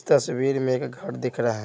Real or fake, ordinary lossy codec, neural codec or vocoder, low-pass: real; none; none; none